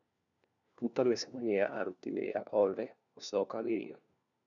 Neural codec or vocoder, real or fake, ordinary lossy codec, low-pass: codec, 16 kHz, 1 kbps, FunCodec, trained on LibriTTS, 50 frames a second; fake; none; 7.2 kHz